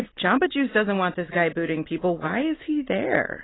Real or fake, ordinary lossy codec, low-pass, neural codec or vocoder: real; AAC, 16 kbps; 7.2 kHz; none